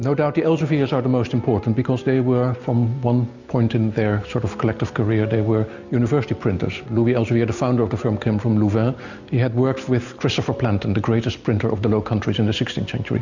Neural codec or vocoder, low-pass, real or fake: none; 7.2 kHz; real